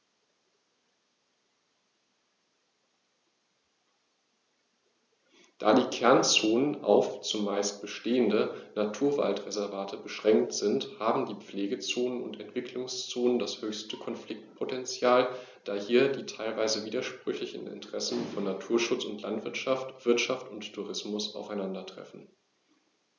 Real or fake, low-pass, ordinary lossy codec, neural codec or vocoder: real; 7.2 kHz; none; none